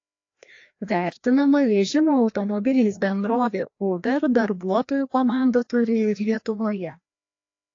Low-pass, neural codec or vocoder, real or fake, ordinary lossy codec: 7.2 kHz; codec, 16 kHz, 1 kbps, FreqCodec, larger model; fake; AAC, 48 kbps